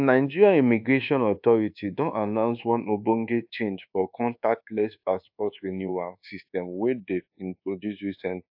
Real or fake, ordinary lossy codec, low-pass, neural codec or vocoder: fake; AAC, 48 kbps; 5.4 kHz; codec, 24 kHz, 1.2 kbps, DualCodec